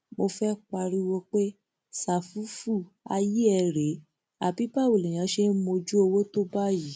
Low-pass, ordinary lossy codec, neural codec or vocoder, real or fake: none; none; none; real